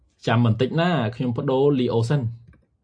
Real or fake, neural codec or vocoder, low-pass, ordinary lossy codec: real; none; 9.9 kHz; AAC, 64 kbps